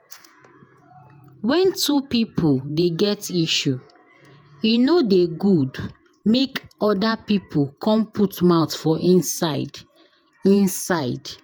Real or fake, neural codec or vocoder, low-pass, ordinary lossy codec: fake; vocoder, 48 kHz, 128 mel bands, Vocos; none; none